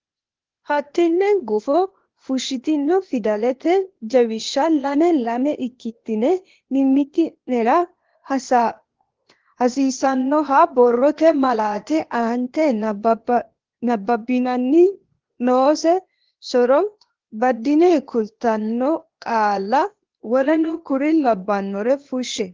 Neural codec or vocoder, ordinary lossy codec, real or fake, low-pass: codec, 16 kHz, 0.8 kbps, ZipCodec; Opus, 16 kbps; fake; 7.2 kHz